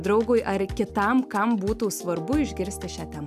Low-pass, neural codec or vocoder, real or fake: 14.4 kHz; none; real